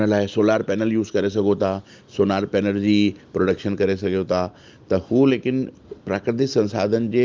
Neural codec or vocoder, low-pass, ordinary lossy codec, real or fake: none; 7.2 kHz; Opus, 32 kbps; real